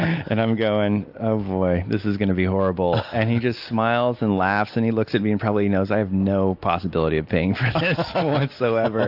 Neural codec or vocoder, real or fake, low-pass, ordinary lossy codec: none; real; 5.4 kHz; AAC, 48 kbps